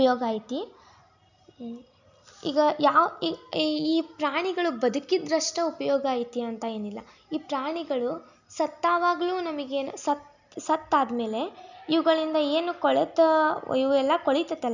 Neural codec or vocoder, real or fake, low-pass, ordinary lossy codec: none; real; 7.2 kHz; none